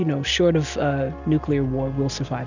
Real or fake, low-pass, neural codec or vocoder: real; 7.2 kHz; none